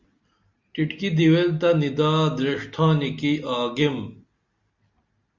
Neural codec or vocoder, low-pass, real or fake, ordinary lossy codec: none; 7.2 kHz; real; Opus, 64 kbps